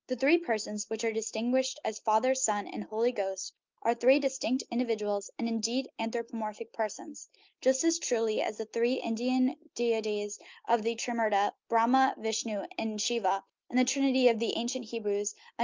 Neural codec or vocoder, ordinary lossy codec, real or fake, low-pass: none; Opus, 32 kbps; real; 7.2 kHz